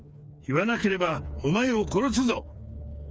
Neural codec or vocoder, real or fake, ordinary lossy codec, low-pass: codec, 16 kHz, 4 kbps, FreqCodec, smaller model; fake; none; none